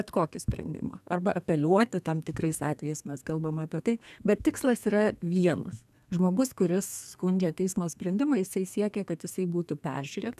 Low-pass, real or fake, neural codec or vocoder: 14.4 kHz; fake; codec, 32 kHz, 1.9 kbps, SNAC